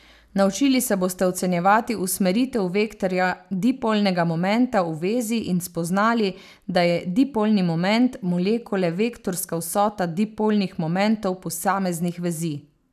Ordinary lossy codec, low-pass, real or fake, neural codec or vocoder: none; 14.4 kHz; real; none